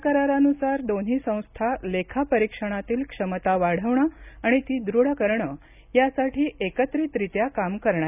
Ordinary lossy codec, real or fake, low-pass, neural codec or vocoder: none; real; 3.6 kHz; none